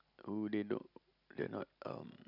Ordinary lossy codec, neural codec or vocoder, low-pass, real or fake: none; none; 5.4 kHz; real